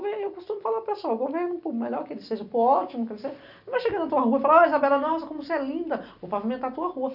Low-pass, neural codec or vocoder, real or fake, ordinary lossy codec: 5.4 kHz; none; real; none